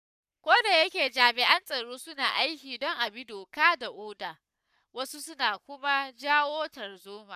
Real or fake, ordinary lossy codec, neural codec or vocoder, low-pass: fake; none; codec, 44.1 kHz, 7.8 kbps, Pupu-Codec; 14.4 kHz